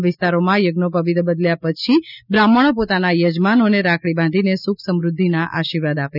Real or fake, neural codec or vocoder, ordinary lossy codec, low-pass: real; none; none; 5.4 kHz